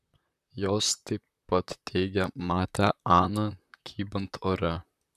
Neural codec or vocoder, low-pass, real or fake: vocoder, 44.1 kHz, 128 mel bands, Pupu-Vocoder; 14.4 kHz; fake